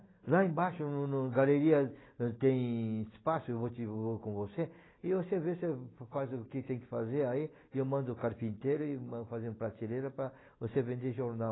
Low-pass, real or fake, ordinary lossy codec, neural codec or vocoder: 7.2 kHz; real; AAC, 16 kbps; none